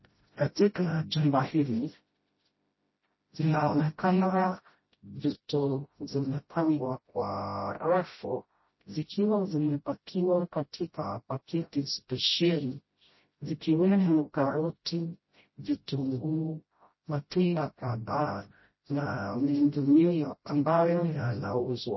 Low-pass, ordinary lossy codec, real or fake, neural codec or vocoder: 7.2 kHz; MP3, 24 kbps; fake; codec, 16 kHz, 0.5 kbps, FreqCodec, smaller model